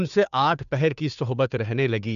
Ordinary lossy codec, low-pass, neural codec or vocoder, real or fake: none; 7.2 kHz; codec, 16 kHz, 2 kbps, FunCodec, trained on Chinese and English, 25 frames a second; fake